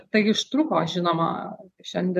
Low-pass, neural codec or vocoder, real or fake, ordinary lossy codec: 14.4 kHz; none; real; MP3, 64 kbps